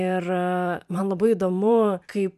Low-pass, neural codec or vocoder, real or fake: 14.4 kHz; vocoder, 44.1 kHz, 128 mel bands every 512 samples, BigVGAN v2; fake